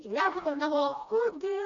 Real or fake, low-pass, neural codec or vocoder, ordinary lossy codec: fake; 7.2 kHz; codec, 16 kHz, 1 kbps, FreqCodec, smaller model; AAC, 48 kbps